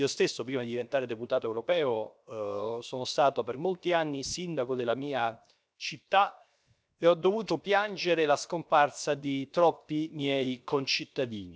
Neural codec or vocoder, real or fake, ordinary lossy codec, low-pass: codec, 16 kHz, 0.7 kbps, FocalCodec; fake; none; none